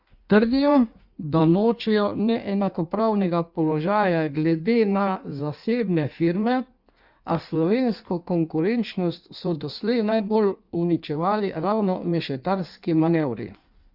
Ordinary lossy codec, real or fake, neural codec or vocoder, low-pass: Opus, 64 kbps; fake; codec, 16 kHz in and 24 kHz out, 1.1 kbps, FireRedTTS-2 codec; 5.4 kHz